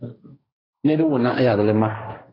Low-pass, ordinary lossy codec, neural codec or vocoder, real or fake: 5.4 kHz; AAC, 24 kbps; codec, 16 kHz, 1.1 kbps, Voila-Tokenizer; fake